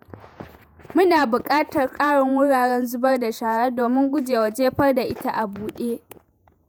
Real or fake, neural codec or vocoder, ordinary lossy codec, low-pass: fake; vocoder, 48 kHz, 128 mel bands, Vocos; none; none